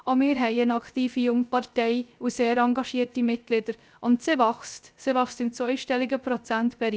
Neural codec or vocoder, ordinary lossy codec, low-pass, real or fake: codec, 16 kHz, 0.3 kbps, FocalCodec; none; none; fake